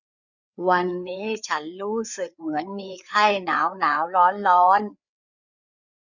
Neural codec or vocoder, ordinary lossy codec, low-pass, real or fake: codec, 16 kHz, 4 kbps, FreqCodec, larger model; none; 7.2 kHz; fake